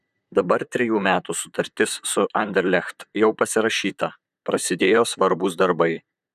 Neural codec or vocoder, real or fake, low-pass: vocoder, 44.1 kHz, 128 mel bands, Pupu-Vocoder; fake; 14.4 kHz